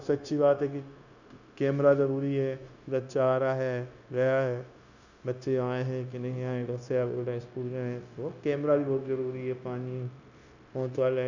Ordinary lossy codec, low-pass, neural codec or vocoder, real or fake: none; 7.2 kHz; codec, 16 kHz, 0.9 kbps, LongCat-Audio-Codec; fake